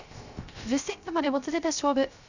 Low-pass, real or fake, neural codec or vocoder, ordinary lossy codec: 7.2 kHz; fake; codec, 16 kHz, 0.3 kbps, FocalCodec; none